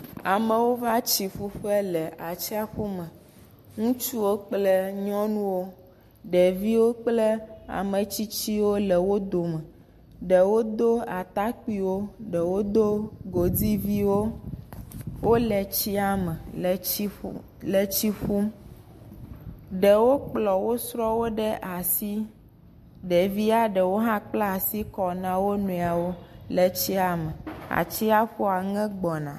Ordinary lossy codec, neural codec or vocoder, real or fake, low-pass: MP3, 64 kbps; none; real; 14.4 kHz